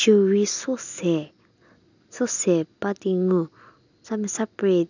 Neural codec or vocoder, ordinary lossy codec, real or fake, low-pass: none; none; real; 7.2 kHz